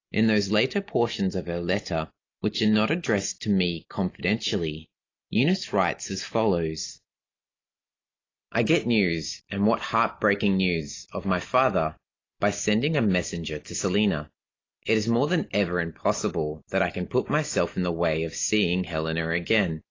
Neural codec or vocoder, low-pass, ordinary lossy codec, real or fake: none; 7.2 kHz; AAC, 32 kbps; real